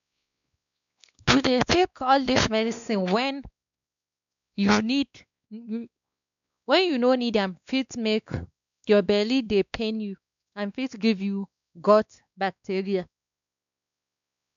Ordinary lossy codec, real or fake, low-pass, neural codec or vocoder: none; fake; 7.2 kHz; codec, 16 kHz, 2 kbps, X-Codec, WavLM features, trained on Multilingual LibriSpeech